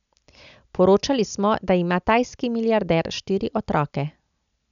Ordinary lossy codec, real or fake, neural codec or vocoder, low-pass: none; real; none; 7.2 kHz